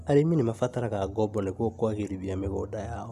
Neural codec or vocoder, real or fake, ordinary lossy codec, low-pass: none; real; none; 14.4 kHz